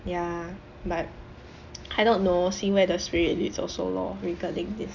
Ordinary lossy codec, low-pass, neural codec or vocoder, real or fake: none; 7.2 kHz; none; real